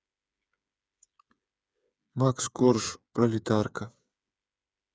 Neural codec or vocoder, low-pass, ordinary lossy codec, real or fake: codec, 16 kHz, 8 kbps, FreqCodec, smaller model; none; none; fake